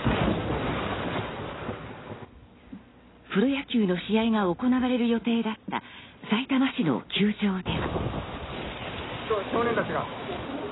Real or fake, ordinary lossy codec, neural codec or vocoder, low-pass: real; AAC, 16 kbps; none; 7.2 kHz